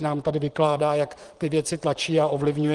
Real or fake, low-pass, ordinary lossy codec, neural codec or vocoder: fake; 10.8 kHz; Opus, 24 kbps; vocoder, 44.1 kHz, 128 mel bands, Pupu-Vocoder